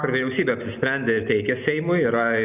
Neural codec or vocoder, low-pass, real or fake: none; 3.6 kHz; real